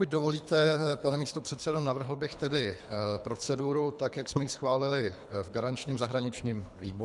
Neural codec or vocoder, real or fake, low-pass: codec, 24 kHz, 3 kbps, HILCodec; fake; 10.8 kHz